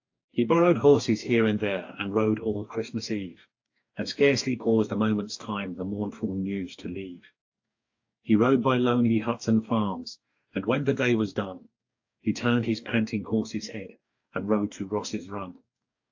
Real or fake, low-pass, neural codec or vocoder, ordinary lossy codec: fake; 7.2 kHz; codec, 44.1 kHz, 2.6 kbps, DAC; AAC, 48 kbps